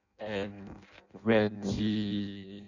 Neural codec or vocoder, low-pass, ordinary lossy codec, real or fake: codec, 16 kHz in and 24 kHz out, 0.6 kbps, FireRedTTS-2 codec; 7.2 kHz; none; fake